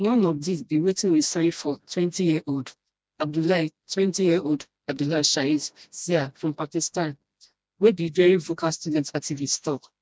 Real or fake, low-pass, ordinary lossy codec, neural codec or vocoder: fake; none; none; codec, 16 kHz, 1 kbps, FreqCodec, smaller model